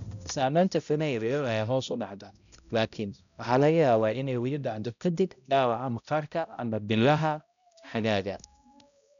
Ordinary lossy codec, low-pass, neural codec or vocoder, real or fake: none; 7.2 kHz; codec, 16 kHz, 0.5 kbps, X-Codec, HuBERT features, trained on balanced general audio; fake